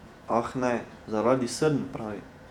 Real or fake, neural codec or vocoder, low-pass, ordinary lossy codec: fake; vocoder, 48 kHz, 128 mel bands, Vocos; 19.8 kHz; none